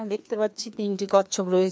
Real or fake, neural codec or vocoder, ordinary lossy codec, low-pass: fake; codec, 16 kHz, 2 kbps, FreqCodec, larger model; none; none